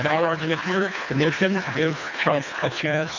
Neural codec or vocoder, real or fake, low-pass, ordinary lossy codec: codec, 24 kHz, 1.5 kbps, HILCodec; fake; 7.2 kHz; MP3, 48 kbps